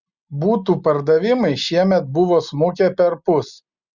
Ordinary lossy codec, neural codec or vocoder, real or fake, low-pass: Opus, 64 kbps; none; real; 7.2 kHz